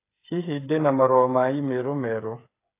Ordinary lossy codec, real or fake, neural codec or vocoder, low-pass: AAC, 24 kbps; fake; codec, 16 kHz, 8 kbps, FreqCodec, smaller model; 3.6 kHz